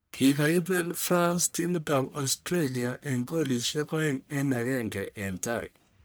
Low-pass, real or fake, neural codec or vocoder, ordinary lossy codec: none; fake; codec, 44.1 kHz, 1.7 kbps, Pupu-Codec; none